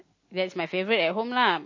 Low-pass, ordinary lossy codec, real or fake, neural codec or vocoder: 7.2 kHz; MP3, 32 kbps; real; none